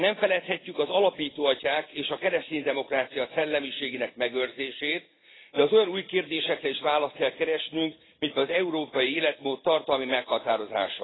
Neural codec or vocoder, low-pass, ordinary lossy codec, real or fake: none; 7.2 kHz; AAC, 16 kbps; real